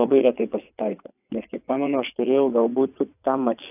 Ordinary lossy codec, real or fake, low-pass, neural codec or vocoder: AAC, 32 kbps; fake; 3.6 kHz; vocoder, 22.05 kHz, 80 mel bands, WaveNeXt